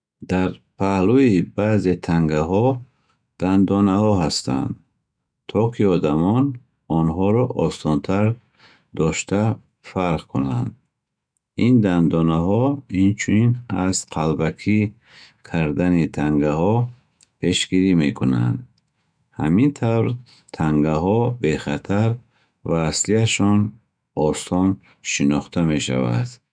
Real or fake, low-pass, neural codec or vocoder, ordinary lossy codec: fake; 9.9 kHz; autoencoder, 48 kHz, 128 numbers a frame, DAC-VAE, trained on Japanese speech; none